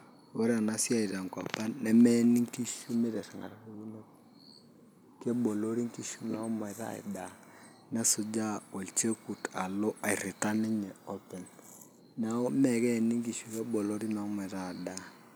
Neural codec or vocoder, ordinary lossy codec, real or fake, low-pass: none; none; real; none